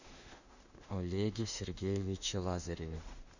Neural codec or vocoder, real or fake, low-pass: autoencoder, 48 kHz, 32 numbers a frame, DAC-VAE, trained on Japanese speech; fake; 7.2 kHz